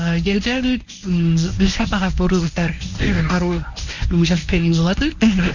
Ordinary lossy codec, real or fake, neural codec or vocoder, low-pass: none; fake; codec, 24 kHz, 0.9 kbps, WavTokenizer, medium speech release version 1; 7.2 kHz